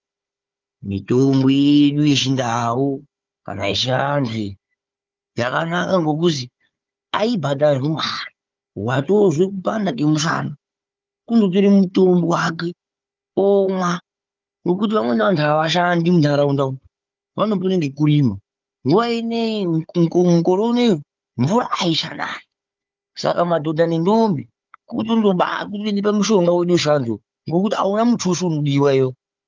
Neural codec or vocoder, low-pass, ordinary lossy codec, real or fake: codec, 16 kHz, 4 kbps, FunCodec, trained on Chinese and English, 50 frames a second; 7.2 kHz; Opus, 32 kbps; fake